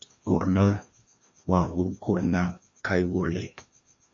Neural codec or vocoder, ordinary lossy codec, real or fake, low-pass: codec, 16 kHz, 1 kbps, FreqCodec, larger model; MP3, 48 kbps; fake; 7.2 kHz